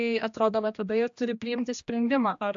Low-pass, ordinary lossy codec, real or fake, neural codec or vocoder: 7.2 kHz; AAC, 64 kbps; fake; codec, 16 kHz, 1 kbps, X-Codec, HuBERT features, trained on general audio